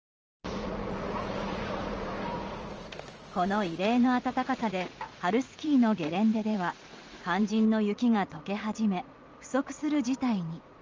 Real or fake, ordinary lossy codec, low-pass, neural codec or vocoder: fake; Opus, 24 kbps; 7.2 kHz; vocoder, 44.1 kHz, 80 mel bands, Vocos